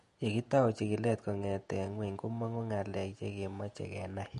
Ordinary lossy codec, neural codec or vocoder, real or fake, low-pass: MP3, 48 kbps; vocoder, 48 kHz, 128 mel bands, Vocos; fake; 14.4 kHz